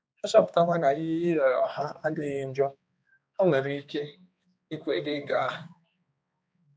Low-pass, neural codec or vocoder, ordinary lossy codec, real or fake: none; codec, 16 kHz, 2 kbps, X-Codec, HuBERT features, trained on balanced general audio; none; fake